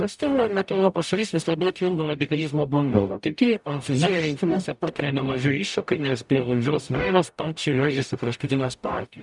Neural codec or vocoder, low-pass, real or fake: codec, 44.1 kHz, 0.9 kbps, DAC; 10.8 kHz; fake